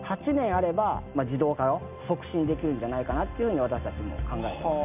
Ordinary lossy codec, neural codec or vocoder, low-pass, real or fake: none; none; 3.6 kHz; real